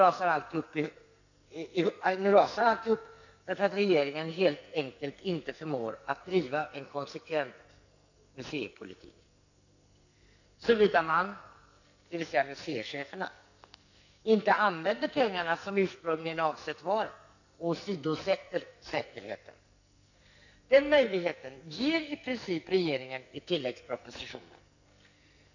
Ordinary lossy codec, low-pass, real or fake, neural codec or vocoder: none; 7.2 kHz; fake; codec, 44.1 kHz, 2.6 kbps, SNAC